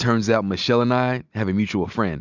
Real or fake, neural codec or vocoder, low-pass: real; none; 7.2 kHz